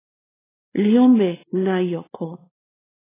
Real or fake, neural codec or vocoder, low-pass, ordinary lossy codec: fake; codec, 16 kHz, 4 kbps, X-Codec, WavLM features, trained on Multilingual LibriSpeech; 3.6 kHz; AAC, 16 kbps